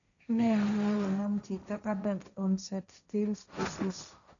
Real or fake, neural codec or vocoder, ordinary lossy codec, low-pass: fake; codec, 16 kHz, 1.1 kbps, Voila-Tokenizer; none; 7.2 kHz